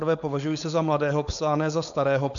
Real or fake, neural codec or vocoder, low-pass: fake; codec, 16 kHz, 4.8 kbps, FACodec; 7.2 kHz